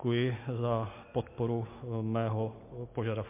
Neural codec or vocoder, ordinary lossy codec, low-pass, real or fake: none; MP3, 24 kbps; 3.6 kHz; real